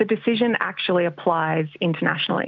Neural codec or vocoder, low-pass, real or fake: none; 7.2 kHz; real